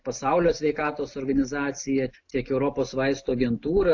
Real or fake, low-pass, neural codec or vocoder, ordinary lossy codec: real; 7.2 kHz; none; AAC, 48 kbps